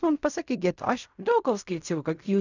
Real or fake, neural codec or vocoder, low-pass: fake; codec, 16 kHz in and 24 kHz out, 0.4 kbps, LongCat-Audio-Codec, fine tuned four codebook decoder; 7.2 kHz